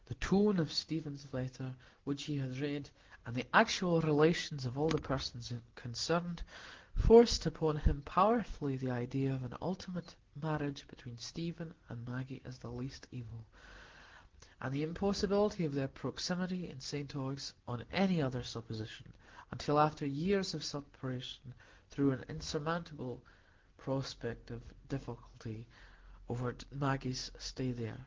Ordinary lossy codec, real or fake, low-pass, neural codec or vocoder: Opus, 16 kbps; real; 7.2 kHz; none